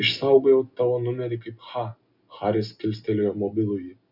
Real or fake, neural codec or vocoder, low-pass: real; none; 5.4 kHz